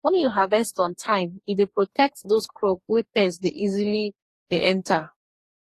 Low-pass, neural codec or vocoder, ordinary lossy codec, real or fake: 14.4 kHz; codec, 44.1 kHz, 2.6 kbps, DAC; AAC, 48 kbps; fake